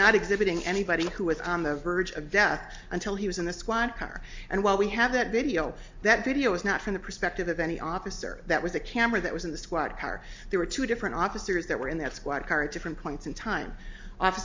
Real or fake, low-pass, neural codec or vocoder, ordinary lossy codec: real; 7.2 kHz; none; MP3, 64 kbps